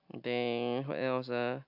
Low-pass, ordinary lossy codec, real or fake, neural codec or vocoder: 5.4 kHz; AAC, 48 kbps; real; none